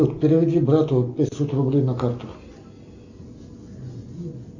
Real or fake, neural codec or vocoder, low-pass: real; none; 7.2 kHz